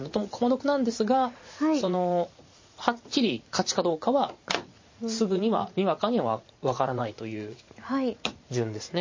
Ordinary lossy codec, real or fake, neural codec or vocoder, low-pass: MP3, 32 kbps; fake; vocoder, 44.1 kHz, 80 mel bands, Vocos; 7.2 kHz